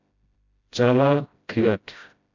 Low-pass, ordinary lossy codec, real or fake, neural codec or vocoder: 7.2 kHz; MP3, 64 kbps; fake; codec, 16 kHz, 0.5 kbps, FreqCodec, smaller model